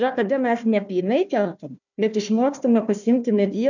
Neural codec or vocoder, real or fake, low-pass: codec, 16 kHz, 1 kbps, FunCodec, trained on Chinese and English, 50 frames a second; fake; 7.2 kHz